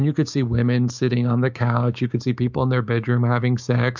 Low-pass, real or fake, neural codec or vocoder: 7.2 kHz; fake; codec, 16 kHz, 6 kbps, DAC